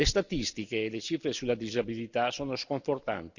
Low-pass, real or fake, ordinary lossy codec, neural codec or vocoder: 7.2 kHz; real; none; none